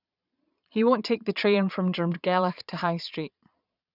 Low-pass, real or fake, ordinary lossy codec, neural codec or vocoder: 5.4 kHz; fake; none; vocoder, 22.05 kHz, 80 mel bands, Vocos